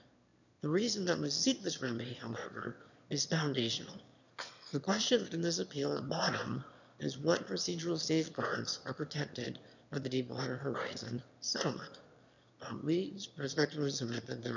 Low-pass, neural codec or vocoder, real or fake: 7.2 kHz; autoencoder, 22.05 kHz, a latent of 192 numbers a frame, VITS, trained on one speaker; fake